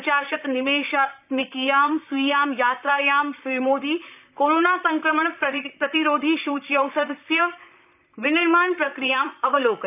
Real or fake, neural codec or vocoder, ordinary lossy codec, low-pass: fake; vocoder, 44.1 kHz, 128 mel bands, Pupu-Vocoder; none; 3.6 kHz